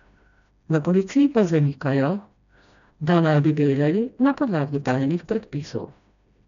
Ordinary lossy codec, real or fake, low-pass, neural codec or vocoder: none; fake; 7.2 kHz; codec, 16 kHz, 1 kbps, FreqCodec, smaller model